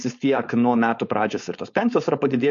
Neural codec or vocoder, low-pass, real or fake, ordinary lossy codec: codec, 16 kHz, 4.8 kbps, FACodec; 7.2 kHz; fake; MP3, 48 kbps